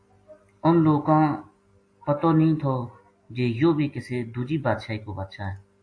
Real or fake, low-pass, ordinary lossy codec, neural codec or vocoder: real; 9.9 kHz; AAC, 48 kbps; none